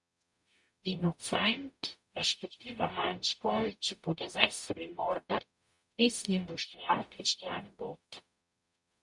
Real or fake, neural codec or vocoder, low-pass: fake; codec, 44.1 kHz, 0.9 kbps, DAC; 10.8 kHz